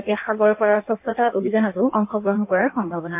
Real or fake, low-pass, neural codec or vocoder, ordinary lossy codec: fake; 3.6 kHz; codec, 16 kHz in and 24 kHz out, 1.1 kbps, FireRedTTS-2 codec; MP3, 32 kbps